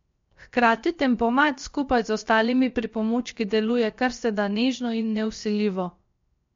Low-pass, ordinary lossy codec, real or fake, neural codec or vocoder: 7.2 kHz; MP3, 48 kbps; fake; codec, 16 kHz, 0.7 kbps, FocalCodec